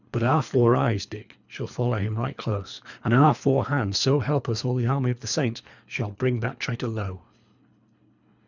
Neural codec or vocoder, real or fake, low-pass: codec, 24 kHz, 3 kbps, HILCodec; fake; 7.2 kHz